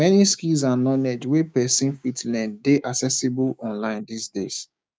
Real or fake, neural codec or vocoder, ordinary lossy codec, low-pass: fake; codec, 16 kHz, 6 kbps, DAC; none; none